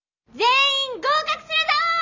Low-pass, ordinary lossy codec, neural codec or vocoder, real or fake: 7.2 kHz; none; none; real